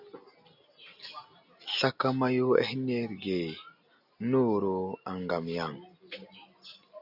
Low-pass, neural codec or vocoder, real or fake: 5.4 kHz; none; real